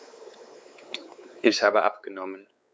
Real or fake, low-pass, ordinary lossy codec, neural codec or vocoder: fake; none; none; codec, 16 kHz, 4 kbps, X-Codec, WavLM features, trained on Multilingual LibriSpeech